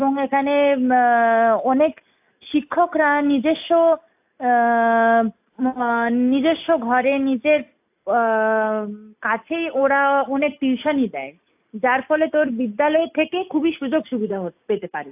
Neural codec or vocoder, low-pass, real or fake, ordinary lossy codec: none; 3.6 kHz; real; AAC, 32 kbps